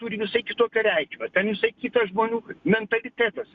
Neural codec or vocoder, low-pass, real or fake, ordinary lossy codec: none; 7.2 kHz; real; Opus, 64 kbps